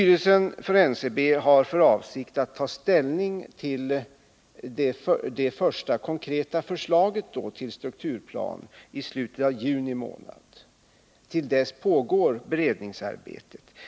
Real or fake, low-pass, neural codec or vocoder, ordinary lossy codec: real; none; none; none